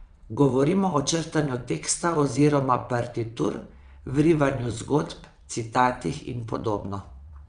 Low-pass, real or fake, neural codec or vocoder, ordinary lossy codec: 9.9 kHz; fake; vocoder, 22.05 kHz, 80 mel bands, WaveNeXt; none